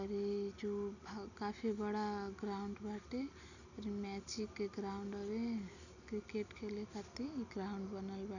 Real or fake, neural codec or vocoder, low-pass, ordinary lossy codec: real; none; 7.2 kHz; none